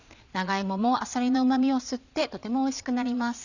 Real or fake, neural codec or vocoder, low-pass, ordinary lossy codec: fake; vocoder, 22.05 kHz, 80 mel bands, Vocos; 7.2 kHz; none